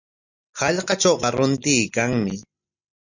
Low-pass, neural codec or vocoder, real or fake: 7.2 kHz; none; real